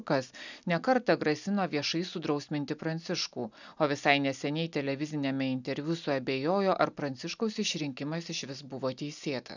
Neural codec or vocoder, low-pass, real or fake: none; 7.2 kHz; real